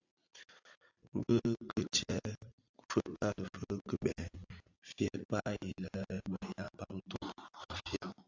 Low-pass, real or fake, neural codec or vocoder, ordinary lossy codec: 7.2 kHz; fake; vocoder, 44.1 kHz, 80 mel bands, Vocos; AAC, 48 kbps